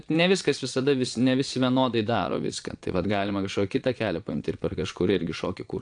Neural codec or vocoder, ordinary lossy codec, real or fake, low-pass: vocoder, 22.05 kHz, 80 mel bands, Vocos; AAC, 64 kbps; fake; 9.9 kHz